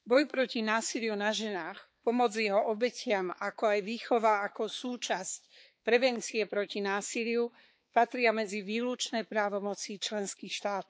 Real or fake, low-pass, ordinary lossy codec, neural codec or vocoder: fake; none; none; codec, 16 kHz, 4 kbps, X-Codec, HuBERT features, trained on balanced general audio